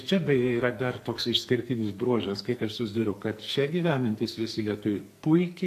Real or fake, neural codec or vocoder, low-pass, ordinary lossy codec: fake; codec, 44.1 kHz, 2.6 kbps, SNAC; 14.4 kHz; AAC, 64 kbps